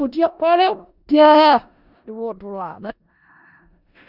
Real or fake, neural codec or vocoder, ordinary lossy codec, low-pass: fake; codec, 16 kHz in and 24 kHz out, 0.4 kbps, LongCat-Audio-Codec, four codebook decoder; none; 5.4 kHz